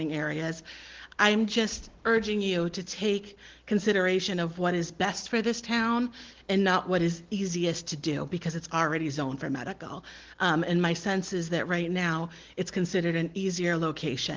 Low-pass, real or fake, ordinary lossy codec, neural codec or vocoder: 7.2 kHz; real; Opus, 16 kbps; none